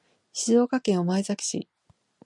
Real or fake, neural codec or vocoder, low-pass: real; none; 10.8 kHz